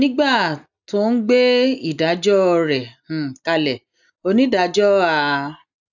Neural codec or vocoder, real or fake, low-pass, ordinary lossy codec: none; real; 7.2 kHz; none